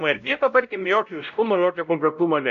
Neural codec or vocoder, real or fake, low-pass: codec, 16 kHz, 0.5 kbps, X-Codec, WavLM features, trained on Multilingual LibriSpeech; fake; 7.2 kHz